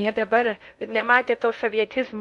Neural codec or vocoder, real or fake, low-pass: codec, 16 kHz in and 24 kHz out, 0.6 kbps, FocalCodec, streaming, 2048 codes; fake; 10.8 kHz